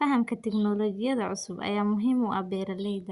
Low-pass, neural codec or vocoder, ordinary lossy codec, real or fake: 10.8 kHz; none; Opus, 64 kbps; real